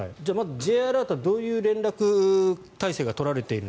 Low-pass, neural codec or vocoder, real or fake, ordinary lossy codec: none; none; real; none